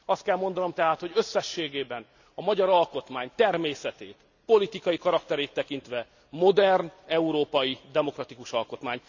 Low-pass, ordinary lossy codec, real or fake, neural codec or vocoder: 7.2 kHz; none; real; none